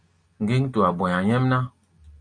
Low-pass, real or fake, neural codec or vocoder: 9.9 kHz; real; none